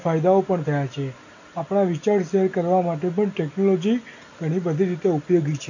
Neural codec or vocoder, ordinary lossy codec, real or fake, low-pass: none; none; real; 7.2 kHz